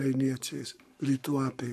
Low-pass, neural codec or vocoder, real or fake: 14.4 kHz; codec, 44.1 kHz, 7.8 kbps, Pupu-Codec; fake